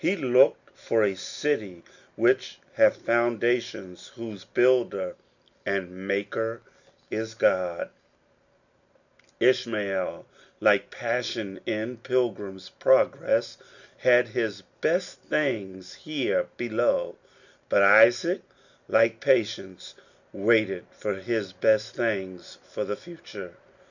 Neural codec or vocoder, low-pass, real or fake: none; 7.2 kHz; real